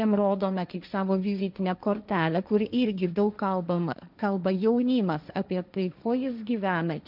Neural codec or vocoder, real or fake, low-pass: codec, 16 kHz, 1.1 kbps, Voila-Tokenizer; fake; 5.4 kHz